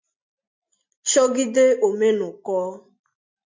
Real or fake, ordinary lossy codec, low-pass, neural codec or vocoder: real; MP3, 48 kbps; 7.2 kHz; none